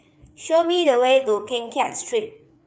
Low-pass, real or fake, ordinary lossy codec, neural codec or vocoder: none; fake; none; codec, 16 kHz, 4 kbps, FreqCodec, larger model